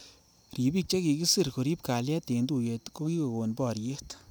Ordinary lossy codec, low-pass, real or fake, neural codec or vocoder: none; none; real; none